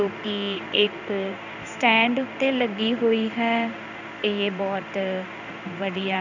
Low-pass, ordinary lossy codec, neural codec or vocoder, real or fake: 7.2 kHz; none; codec, 16 kHz in and 24 kHz out, 1 kbps, XY-Tokenizer; fake